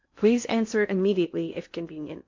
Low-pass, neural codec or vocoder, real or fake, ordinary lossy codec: 7.2 kHz; codec, 16 kHz in and 24 kHz out, 0.6 kbps, FocalCodec, streaming, 2048 codes; fake; MP3, 48 kbps